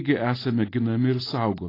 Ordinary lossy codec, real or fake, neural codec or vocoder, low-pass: AAC, 24 kbps; real; none; 5.4 kHz